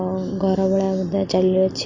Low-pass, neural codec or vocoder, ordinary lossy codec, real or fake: 7.2 kHz; none; Opus, 64 kbps; real